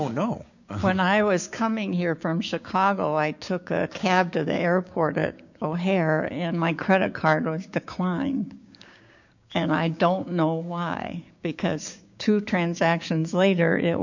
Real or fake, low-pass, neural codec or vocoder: fake; 7.2 kHz; codec, 16 kHz, 6 kbps, DAC